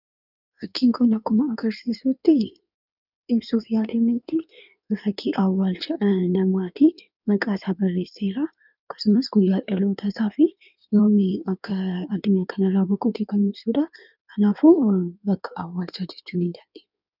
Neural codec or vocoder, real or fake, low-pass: codec, 16 kHz in and 24 kHz out, 1.1 kbps, FireRedTTS-2 codec; fake; 5.4 kHz